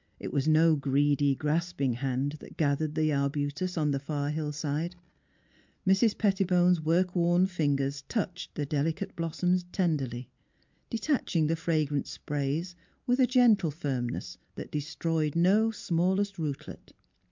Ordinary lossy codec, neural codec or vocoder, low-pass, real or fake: MP3, 64 kbps; none; 7.2 kHz; real